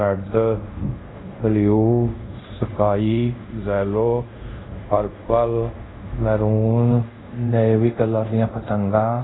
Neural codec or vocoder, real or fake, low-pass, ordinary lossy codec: codec, 24 kHz, 0.5 kbps, DualCodec; fake; 7.2 kHz; AAC, 16 kbps